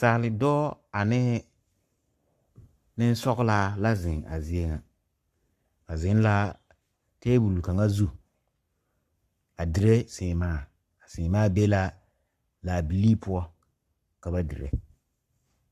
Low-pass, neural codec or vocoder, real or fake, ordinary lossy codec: 14.4 kHz; codec, 44.1 kHz, 7.8 kbps, Pupu-Codec; fake; Opus, 64 kbps